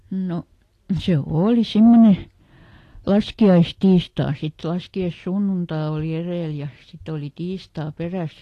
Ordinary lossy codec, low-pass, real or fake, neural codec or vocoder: AAC, 48 kbps; 14.4 kHz; real; none